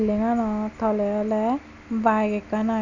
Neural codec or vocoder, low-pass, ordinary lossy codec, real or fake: none; 7.2 kHz; none; real